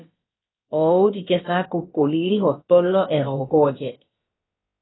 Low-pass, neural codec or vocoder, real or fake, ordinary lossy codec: 7.2 kHz; codec, 16 kHz, about 1 kbps, DyCAST, with the encoder's durations; fake; AAC, 16 kbps